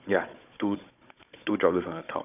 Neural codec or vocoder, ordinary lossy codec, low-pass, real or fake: codec, 16 kHz, 16 kbps, FunCodec, trained on Chinese and English, 50 frames a second; none; 3.6 kHz; fake